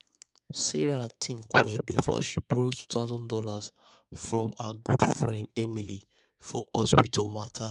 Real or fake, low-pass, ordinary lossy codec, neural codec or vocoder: fake; 10.8 kHz; none; codec, 24 kHz, 1 kbps, SNAC